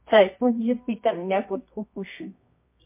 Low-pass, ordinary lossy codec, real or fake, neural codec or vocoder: 3.6 kHz; MP3, 24 kbps; fake; codec, 24 kHz, 0.9 kbps, WavTokenizer, medium music audio release